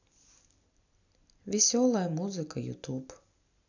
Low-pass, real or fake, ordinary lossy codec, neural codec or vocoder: 7.2 kHz; real; none; none